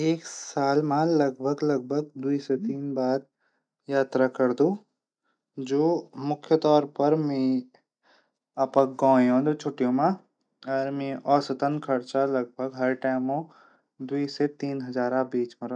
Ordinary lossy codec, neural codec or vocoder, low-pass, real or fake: none; none; 9.9 kHz; real